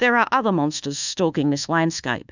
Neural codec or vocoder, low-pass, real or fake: codec, 24 kHz, 1.2 kbps, DualCodec; 7.2 kHz; fake